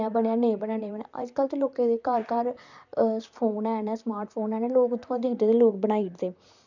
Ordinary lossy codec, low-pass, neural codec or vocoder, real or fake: none; 7.2 kHz; vocoder, 44.1 kHz, 128 mel bands, Pupu-Vocoder; fake